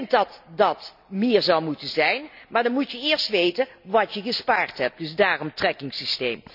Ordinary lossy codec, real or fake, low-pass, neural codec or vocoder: none; real; 5.4 kHz; none